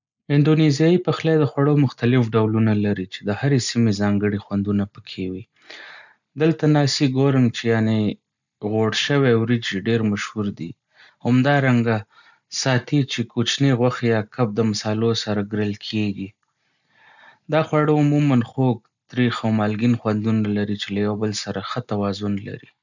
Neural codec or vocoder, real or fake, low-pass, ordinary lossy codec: none; real; 7.2 kHz; none